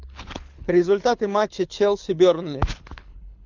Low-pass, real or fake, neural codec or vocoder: 7.2 kHz; fake; codec, 24 kHz, 6 kbps, HILCodec